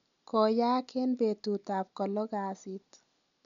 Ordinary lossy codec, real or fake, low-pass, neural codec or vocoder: none; real; 7.2 kHz; none